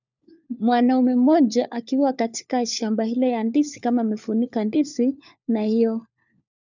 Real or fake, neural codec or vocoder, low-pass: fake; codec, 16 kHz, 4 kbps, FunCodec, trained on LibriTTS, 50 frames a second; 7.2 kHz